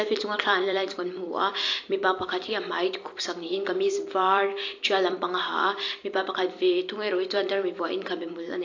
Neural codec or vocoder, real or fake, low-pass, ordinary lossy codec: vocoder, 44.1 kHz, 128 mel bands every 256 samples, BigVGAN v2; fake; 7.2 kHz; AAC, 48 kbps